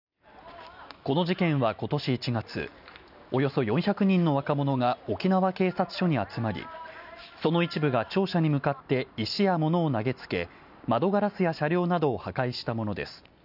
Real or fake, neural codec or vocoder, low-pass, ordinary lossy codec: real; none; 5.4 kHz; none